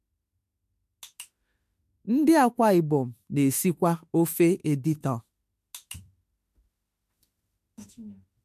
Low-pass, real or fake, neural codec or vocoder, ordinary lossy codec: 14.4 kHz; fake; autoencoder, 48 kHz, 32 numbers a frame, DAC-VAE, trained on Japanese speech; MP3, 64 kbps